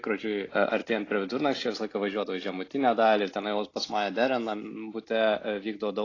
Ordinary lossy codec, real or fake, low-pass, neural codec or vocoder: AAC, 32 kbps; real; 7.2 kHz; none